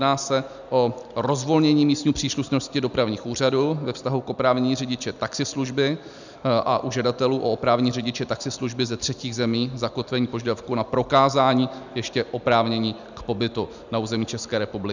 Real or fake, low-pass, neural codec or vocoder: real; 7.2 kHz; none